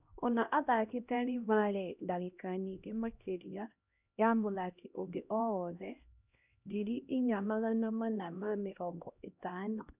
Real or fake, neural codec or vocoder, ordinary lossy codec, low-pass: fake; codec, 16 kHz, 1 kbps, X-Codec, HuBERT features, trained on LibriSpeech; none; 3.6 kHz